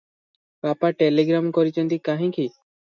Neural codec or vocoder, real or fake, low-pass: none; real; 7.2 kHz